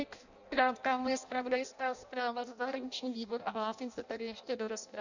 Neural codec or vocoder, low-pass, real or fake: codec, 16 kHz in and 24 kHz out, 0.6 kbps, FireRedTTS-2 codec; 7.2 kHz; fake